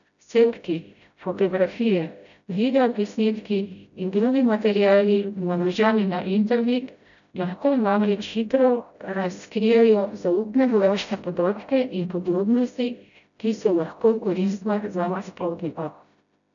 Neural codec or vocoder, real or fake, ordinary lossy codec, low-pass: codec, 16 kHz, 0.5 kbps, FreqCodec, smaller model; fake; none; 7.2 kHz